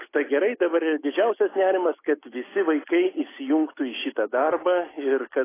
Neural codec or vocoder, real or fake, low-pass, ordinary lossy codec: none; real; 3.6 kHz; AAC, 16 kbps